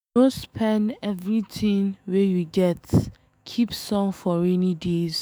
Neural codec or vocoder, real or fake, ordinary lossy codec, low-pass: autoencoder, 48 kHz, 128 numbers a frame, DAC-VAE, trained on Japanese speech; fake; none; none